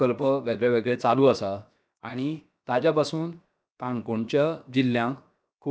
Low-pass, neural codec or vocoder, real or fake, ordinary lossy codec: none; codec, 16 kHz, 0.7 kbps, FocalCodec; fake; none